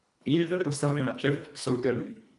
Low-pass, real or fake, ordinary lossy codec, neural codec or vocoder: 10.8 kHz; fake; none; codec, 24 kHz, 1.5 kbps, HILCodec